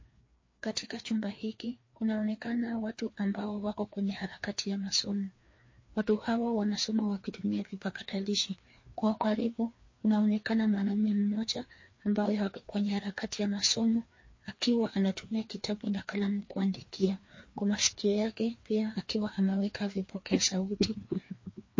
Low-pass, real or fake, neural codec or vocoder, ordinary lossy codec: 7.2 kHz; fake; codec, 16 kHz, 2 kbps, FreqCodec, larger model; MP3, 32 kbps